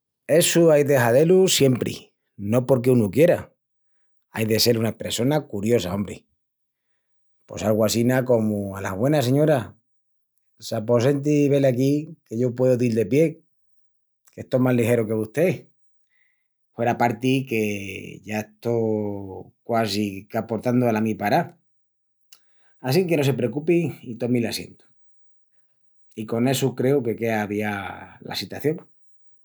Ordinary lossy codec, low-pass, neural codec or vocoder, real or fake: none; none; none; real